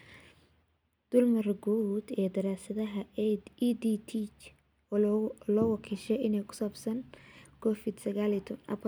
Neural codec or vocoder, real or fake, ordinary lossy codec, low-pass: none; real; none; none